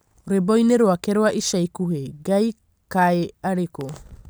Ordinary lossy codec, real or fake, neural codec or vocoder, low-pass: none; real; none; none